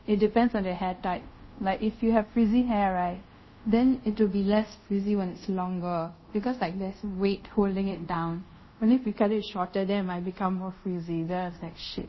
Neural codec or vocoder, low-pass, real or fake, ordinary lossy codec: codec, 24 kHz, 0.5 kbps, DualCodec; 7.2 kHz; fake; MP3, 24 kbps